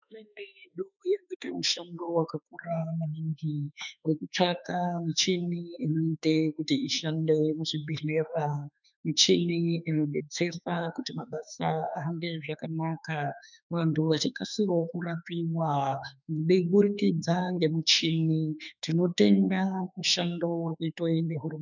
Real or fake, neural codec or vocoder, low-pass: fake; autoencoder, 48 kHz, 32 numbers a frame, DAC-VAE, trained on Japanese speech; 7.2 kHz